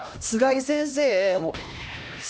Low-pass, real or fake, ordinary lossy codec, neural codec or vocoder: none; fake; none; codec, 16 kHz, 1 kbps, X-Codec, HuBERT features, trained on LibriSpeech